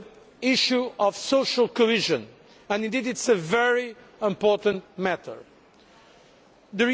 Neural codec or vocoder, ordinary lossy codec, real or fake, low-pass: none; none; real; none